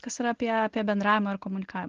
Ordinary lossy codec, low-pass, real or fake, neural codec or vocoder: Opus, 16 kbps; 7.2 kHz; real; none